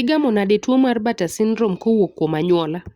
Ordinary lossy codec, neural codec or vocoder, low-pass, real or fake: Opus, 64 kbps; none; 19.8 kHz; real